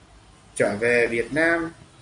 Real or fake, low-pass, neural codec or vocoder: real; 9.9 kHz; none